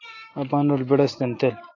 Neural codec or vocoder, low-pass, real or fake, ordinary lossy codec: none; 7.2 kHz; real; AAC, 32 kbps